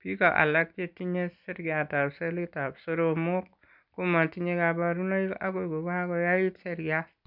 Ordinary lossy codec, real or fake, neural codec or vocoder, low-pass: none; real; none; 5.4 kHz